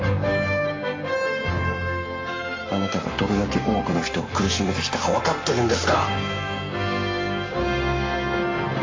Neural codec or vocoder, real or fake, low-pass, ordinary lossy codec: codec, 44.1 kHz, 7.8 kbps, Pupu-Codec; fake; 7.2 kHz; MP3, 48 kbps